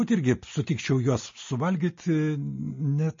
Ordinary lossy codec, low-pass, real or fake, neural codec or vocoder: MP3, 32 kbps; 7.2 kHz; real; none